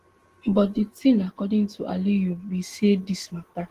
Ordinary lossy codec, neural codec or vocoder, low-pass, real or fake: Opus, 16 kbps; none; 14.4 kHz; real